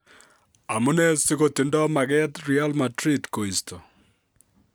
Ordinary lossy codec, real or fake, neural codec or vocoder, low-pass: none; real; none; none